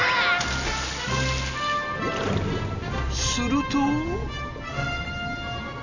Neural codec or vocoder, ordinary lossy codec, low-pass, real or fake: none; none; 7.2 kHz; real